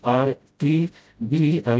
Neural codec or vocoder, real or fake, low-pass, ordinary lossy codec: codec, 16 kHz, 0.5 kbps, FreqCodec, smaller model; fake; none; none